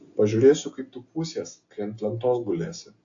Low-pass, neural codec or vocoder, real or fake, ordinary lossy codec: 7.2 kHz; none; real; AAC, 48 kbps